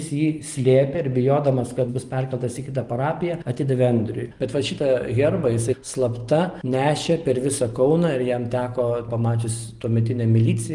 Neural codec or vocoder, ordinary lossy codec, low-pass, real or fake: none; Opus, 24 kbps; 10.8 kHz; real